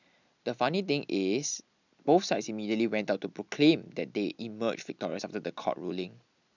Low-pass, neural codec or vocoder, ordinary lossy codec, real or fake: 7.2 kHz; none; none; real